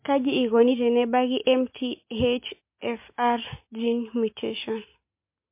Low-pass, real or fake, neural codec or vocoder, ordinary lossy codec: 3.6 kHz; real; none; MP3, 24 kbps